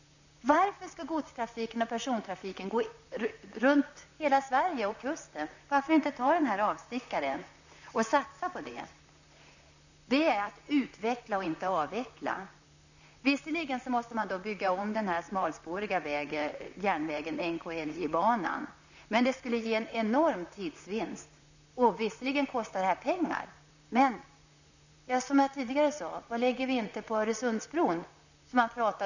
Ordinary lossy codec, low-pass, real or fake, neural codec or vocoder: none; 7.2 kHz; fake; vocoder, 44.1 kHz, 128 mel bands, Pupu-Vocoder